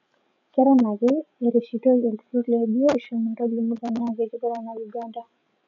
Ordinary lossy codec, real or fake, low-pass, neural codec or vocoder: none; fake; 7.2 kHz; codec, 16 kHz, 16 kbps, FreqCodec, larger model